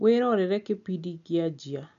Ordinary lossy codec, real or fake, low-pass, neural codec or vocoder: none; real; 7.2 kHz; none